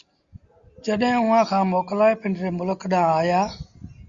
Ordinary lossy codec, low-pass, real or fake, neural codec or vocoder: Opus, 64 kbps; 7.2 kHz; real; none